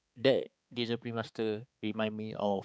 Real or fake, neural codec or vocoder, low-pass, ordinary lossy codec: fake; codec, 16 kHz, 4 kbps, X-Codec, HuBERT features, trained on balanced general audio; none; none